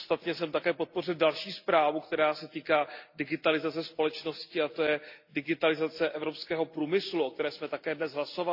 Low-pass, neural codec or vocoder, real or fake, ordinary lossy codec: 5.4 kHz; none; real; AAC, 48 kbps